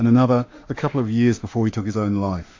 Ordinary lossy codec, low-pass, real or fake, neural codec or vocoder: AAC, 48 kbps; 7.2 kHz; fake; autoencoder, 48 kHz, 32 numbers a frame, DAC-VAE, trained on Japanese speech